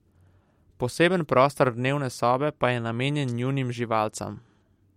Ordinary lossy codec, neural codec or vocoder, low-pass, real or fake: MP3, 64 kbps; none; 19.8 kHz; real